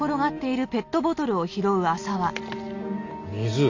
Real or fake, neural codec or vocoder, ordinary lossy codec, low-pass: real; none; AAC, 48 kbps; 7.2 kHz